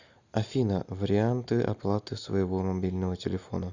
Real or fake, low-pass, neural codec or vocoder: real; 7.2 kHz; none